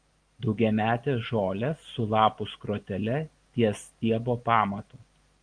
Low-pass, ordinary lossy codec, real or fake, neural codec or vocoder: 9.9 kHz; Opus, 24 kbps; real; none